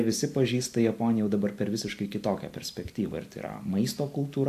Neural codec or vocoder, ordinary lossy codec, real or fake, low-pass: none; AAC, 64 kbps; real; 14.4 kHz